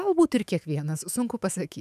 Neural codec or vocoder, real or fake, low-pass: autoencoder, 48 kHz, 128 numbers a frame, DAC-VAE, trained on Japanese speech; fake; 14.4 kHz